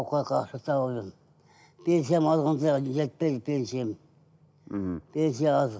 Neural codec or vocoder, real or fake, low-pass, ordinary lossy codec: none; real; none; none